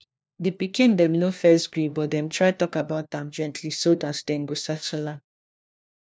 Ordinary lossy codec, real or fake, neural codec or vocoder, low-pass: none; fake; codec, 16 kHz, 1 kbps, FunCodec, trained on LibriTTS, 50 frames a second; none